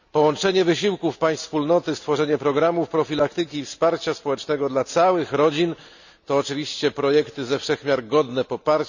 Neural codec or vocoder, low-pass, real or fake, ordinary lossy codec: none; 7.2 kHz; real; none